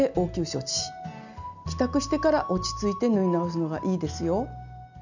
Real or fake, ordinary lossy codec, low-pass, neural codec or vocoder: real; none; 7.2 kHz; none